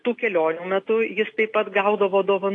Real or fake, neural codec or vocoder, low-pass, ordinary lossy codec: real; none; 10.8 kHz; AAC, 48 kbps